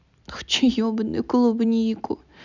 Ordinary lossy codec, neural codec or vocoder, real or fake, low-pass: none; none; real; 7.2 kHz